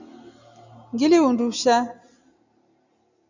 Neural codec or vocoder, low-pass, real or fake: none; 7.2 kHz; real